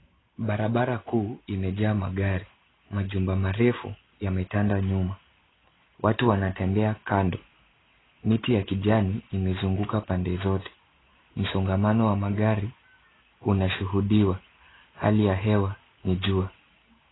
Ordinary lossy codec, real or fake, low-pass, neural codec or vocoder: AAC, 16 kbps; real; 7.2 kHz; none